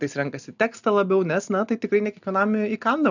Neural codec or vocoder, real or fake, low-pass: none; real; 7.2 kHz